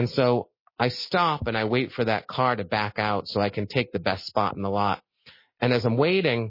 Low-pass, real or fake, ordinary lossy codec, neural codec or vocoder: 5.4 kHz; real; MP3, 24 kbps; none